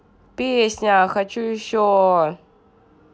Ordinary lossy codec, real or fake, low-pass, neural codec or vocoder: none; real; none; none